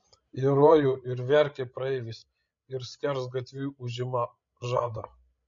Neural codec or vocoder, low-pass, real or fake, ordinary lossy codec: codec, 16 kHz, 16 kbps, FreqCodec, larger model; 7.2 kHz; fake; MP3, 48 kbps